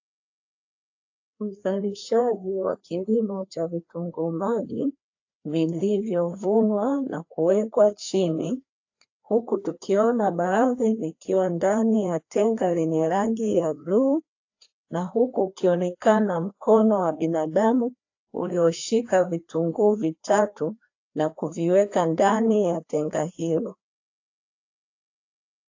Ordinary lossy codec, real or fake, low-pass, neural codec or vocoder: AAC, 48 kbps; fake; 7.2 kHz; codec, 16 kHz, 2 kbps, FreqCodec, larger model